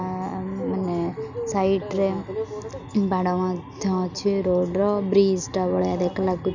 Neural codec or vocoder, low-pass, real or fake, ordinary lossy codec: none; 7.2 kHz; real; none